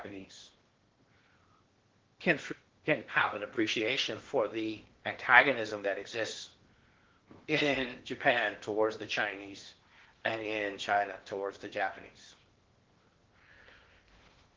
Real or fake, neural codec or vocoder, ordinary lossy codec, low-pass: fake; codec, 16 kHz in and 24 kHz out, 0.8 kbps, FocalCodec, streaming, 65536 codes; Opus, 16 kbps; 7.2 kHz